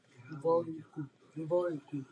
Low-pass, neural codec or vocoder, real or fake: 9.9 kHz; none; real